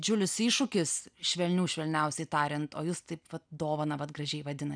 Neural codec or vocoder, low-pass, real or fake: none; 9.9 kHz; real